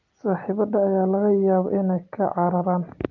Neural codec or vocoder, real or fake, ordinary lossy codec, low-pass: none; real; Opus, 32 kbps; 7.2 kHz